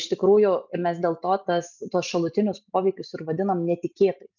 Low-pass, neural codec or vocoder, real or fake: 7.2 kHz; none; real